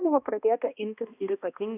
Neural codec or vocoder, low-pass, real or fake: codec, 16 kHz, 1 kbps, X-Codec, HuBERT features, trained on balanced general audio; 3.6 kHz; fake